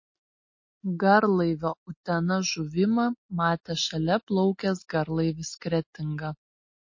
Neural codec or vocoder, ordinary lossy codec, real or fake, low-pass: none; MP3, 32 kbps; real; 7.2 kHz